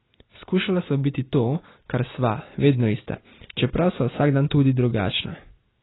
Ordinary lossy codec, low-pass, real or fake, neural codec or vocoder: AAC, 16 kbps; 7.2 kHz; real; none